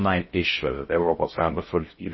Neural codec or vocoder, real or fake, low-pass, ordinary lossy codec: codec, 16 kHz in and 24 kHz out, 0.6 kbps, FocalCodec, streaming, 4096 codes; fake; 7.2 kHz; MP3, 24 kbps